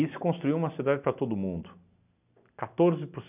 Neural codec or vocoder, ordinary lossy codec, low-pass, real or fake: none; none; 3.6 kHz; real